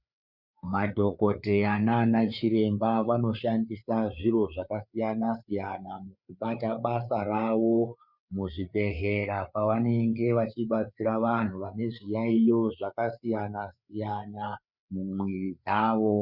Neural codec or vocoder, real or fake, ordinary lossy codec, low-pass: codec, 16 kHz, 4 kbps, FreqCodec, larger model; fake; Opus, 64 kbps; 5.4 kHz